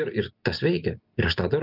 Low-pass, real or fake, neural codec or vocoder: 5.4 kHz; real; none